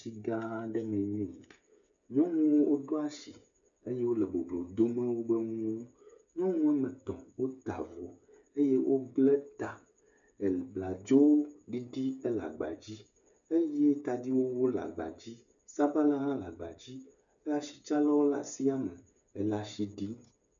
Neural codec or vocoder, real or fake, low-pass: codec, 16 kHz, 8 kbps, FreqCodec, smaller model; fake; 7.2 kHz